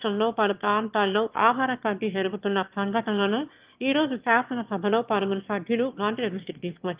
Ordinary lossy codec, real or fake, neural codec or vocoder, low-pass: Opus, 32 kbps; fake; autoencoder, 22.05 kHz, a latent of 192 numbers a frame, VITS, trained on one speaker; 3.6 kHz